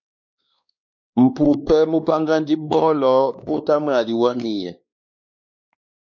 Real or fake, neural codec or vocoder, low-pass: fake; codec, 16 kHz, 2 kbps, X-Codec, WavLM features, trained on Multilingual LibriSpeech; 7.2 kHz